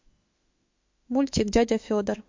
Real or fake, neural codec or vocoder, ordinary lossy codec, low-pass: fake; autoencoder, 48 kHz, 32 numbers a frame, DAC-VAE, trained on Japanese speech; MP3, 48 kbps; 7.2 kHz